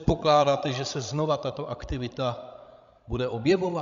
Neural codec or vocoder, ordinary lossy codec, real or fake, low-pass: codec, 16 kHz, 16 kbps, FreqCodec, larger model; MP3, 64 kbps; fake; 7.2 kHz